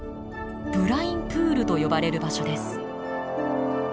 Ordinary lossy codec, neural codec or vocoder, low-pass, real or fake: none; none; none; real